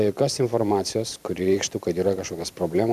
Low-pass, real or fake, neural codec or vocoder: 14.4 kHz; fake; vocoder, 44.1 kHz, 128 mel bands every 512 samples, BigVGAN v2